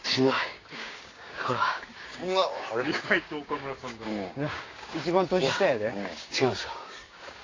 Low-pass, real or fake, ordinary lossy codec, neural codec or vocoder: 7.2 kHz; fake; AAC, 32 kbps; codec, 16 kHz, 6 kbps, DAC